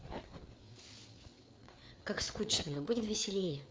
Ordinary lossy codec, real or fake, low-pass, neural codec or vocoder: none; fake; none; codec, 16 kHz, 4 kbps, FreqCodec, larger model